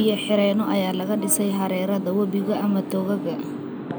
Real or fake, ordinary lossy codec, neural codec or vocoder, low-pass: real; none; none; none